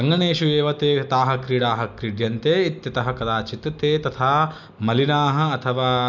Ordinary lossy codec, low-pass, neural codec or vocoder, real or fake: none; 7.2 kHz; none; real